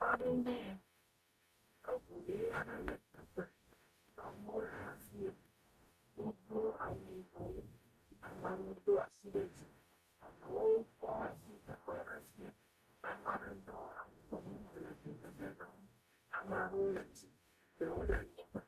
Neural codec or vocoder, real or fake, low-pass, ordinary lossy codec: codec, 44.1 kHz, 0.9 kbps, DAC; fake; 14.4 kHz; AAC, 96 kbps